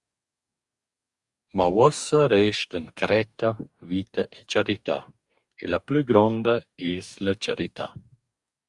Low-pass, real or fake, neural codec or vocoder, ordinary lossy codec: 10.8 kHz; fake; codec, 44.1 kHz, 2.6 kbps, DAC; Opus, 64 kbps